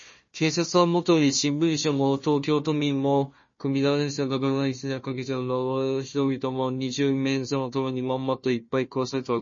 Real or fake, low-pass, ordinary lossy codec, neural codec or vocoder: fake; 7.2 kHz; MP3, 32 kbps; codec, 16 kHz, 1 kbps, FunCodec, trained on Chinese and English, 50 frames a second